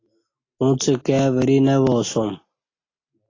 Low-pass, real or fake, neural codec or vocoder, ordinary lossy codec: 7.2 kHz; real; none; AAC, 32 kbps